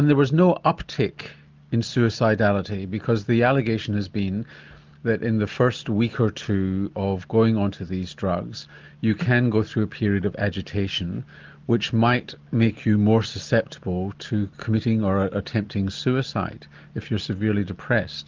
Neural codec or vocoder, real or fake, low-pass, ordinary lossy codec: none; real; 7.2 kHz; Opus, 32 kbps